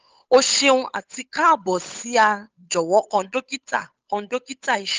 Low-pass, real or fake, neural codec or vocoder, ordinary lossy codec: 7.2 kHz; fake; codec, 16 kHz, 8 kbps, FunCodec, trained on Chinese and English, 25 frames a second; Opus, 24 kbps